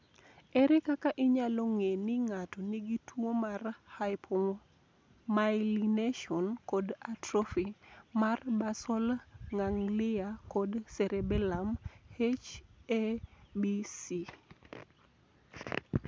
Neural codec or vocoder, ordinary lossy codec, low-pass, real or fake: none; none; none; real